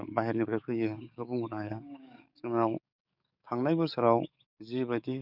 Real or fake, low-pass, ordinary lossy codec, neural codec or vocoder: fake; 5.4 kHz; none; codec, 44.1 kHz, 7.8 kbps, DAC